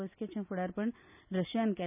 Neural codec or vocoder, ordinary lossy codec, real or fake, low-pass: none; none; real; 3.6 kHz